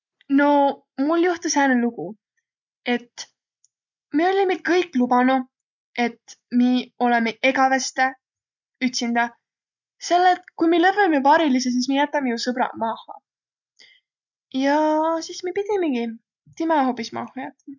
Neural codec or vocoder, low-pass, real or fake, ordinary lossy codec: none; 7.2 kHz; real; none